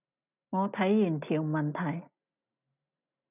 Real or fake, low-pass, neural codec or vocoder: real; 3.6 kHz; none